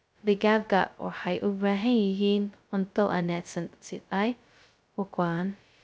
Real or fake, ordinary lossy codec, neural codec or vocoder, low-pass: fake; none; codec, 16 kHz, 0.2 kbps, FocalCodec; none